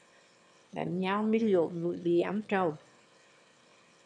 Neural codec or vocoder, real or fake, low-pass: autoencoder, 22.05 kHz, a latent of 192 numbers a frame, VITS, trained on one speaker; fake; 9.9 kHz